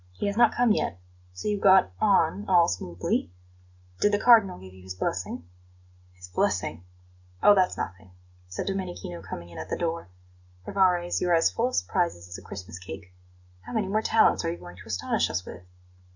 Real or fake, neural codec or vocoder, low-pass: real; none; 7.2 kHz